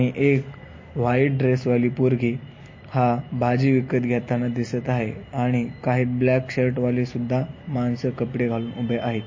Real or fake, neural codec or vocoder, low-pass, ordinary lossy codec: real; none; 7.2 kHz; MP3, 32 kbps